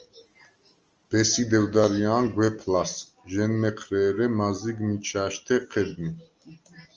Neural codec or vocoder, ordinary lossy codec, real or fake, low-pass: none; Opus, 24 kbps; real; 7.2 kHz